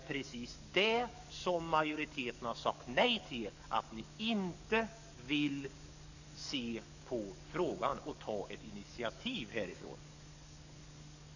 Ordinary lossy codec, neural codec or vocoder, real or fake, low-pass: none; codec, 44.1 kHz, 7.8 kbps, DAC; fake; 7.2 kHz